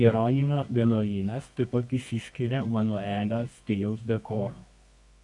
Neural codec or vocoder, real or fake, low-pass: codec, 24 kHz, 0.9 kbps, WavTokenizer, medium music audio release; fake; 10.8 kHz